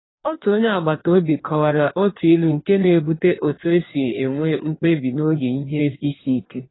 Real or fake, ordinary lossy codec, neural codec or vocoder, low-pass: fake; AAC, 16 kbps; codec, 16 kHz in and 24 kHz out, 1.1 kbps, FireRedTTS-2 codec; 7.2 kHz